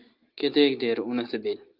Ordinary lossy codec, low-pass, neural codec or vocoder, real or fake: Opus, 32 kbps; 5.4 kHz; none; real